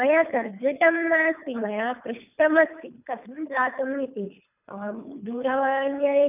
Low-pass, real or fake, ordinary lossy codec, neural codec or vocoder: 3.6 kHz; fake; none; codec, 24 kHz, 3 kbps, HILCodec